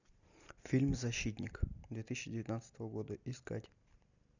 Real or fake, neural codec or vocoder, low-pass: real; none; 7.2 kHz